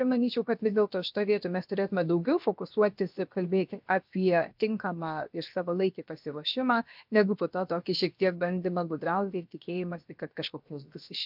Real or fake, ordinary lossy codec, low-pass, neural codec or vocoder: fake; MP3, 48 kbps; 5.4 kHz; codec, 16 kHz, 0.7 kbps, FocalCodec